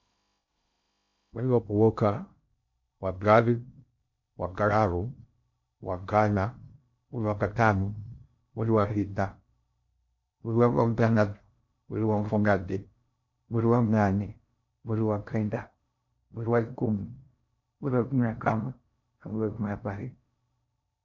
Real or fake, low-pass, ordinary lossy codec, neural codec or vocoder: fake; 7.2 kHz; MP3, 48 kbps; codec, 16 kHz in and 24 kHz out, 0.6 kbps, FocalCodec, streaming, 2048 codes